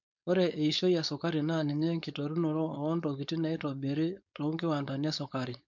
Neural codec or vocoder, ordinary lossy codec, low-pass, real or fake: codec, 16 kHz, 4.8 kbps, FACodec; MP3, 64 kbps; 7.2 kHz; fake